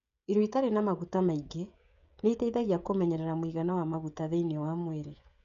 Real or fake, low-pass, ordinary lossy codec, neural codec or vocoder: fake; 7.2 kHz; none; codec, 16 kHz, 16 kbps, FreqCodec, smaller model